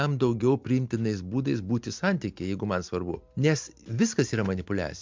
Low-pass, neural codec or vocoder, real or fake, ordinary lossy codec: 7.2 kHz; none; real; MP3, 64 kbps